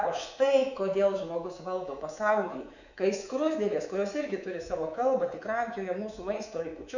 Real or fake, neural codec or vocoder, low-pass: fake; codec, 24 kHz, 3.1 kbps, DualCodec; 7.2 kHz